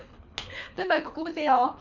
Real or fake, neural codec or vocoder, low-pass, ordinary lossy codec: fake; codec, 24 kHz, 3 kbps, HILCodec; 7.2 kHz; none